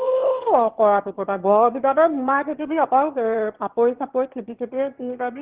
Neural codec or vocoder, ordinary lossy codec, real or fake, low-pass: autoencoder, 22.05 kHz, a latent of 192 numbers a frame, VITS, trained on one speaker; Opus, 16 kbps; fake; 3.6 kHz